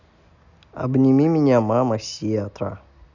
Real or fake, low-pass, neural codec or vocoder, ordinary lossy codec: real; 7.2 kHz; none; none